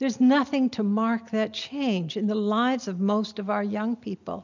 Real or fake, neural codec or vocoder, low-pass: real; none; 7.2 kHz